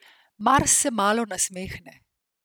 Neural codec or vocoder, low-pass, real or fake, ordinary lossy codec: none; none; real; none